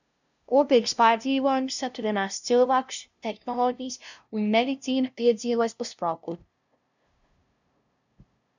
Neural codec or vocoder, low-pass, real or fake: codec, 16 kHz, 0.5 kbps, FunCodec, trained on LibriTTS, 25 frames a second; 7.2 kHz; fake